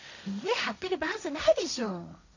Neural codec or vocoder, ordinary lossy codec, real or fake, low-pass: codec, 16 kHz, 1.1 kbps, Voila-Tokenizer; none; fake; 7.2 kHz